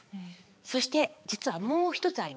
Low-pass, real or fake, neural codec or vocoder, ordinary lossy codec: none; fake; codec, 16 kHz, 4 kbps, X-Codec, HuBERT features, trained on balanced general audio; none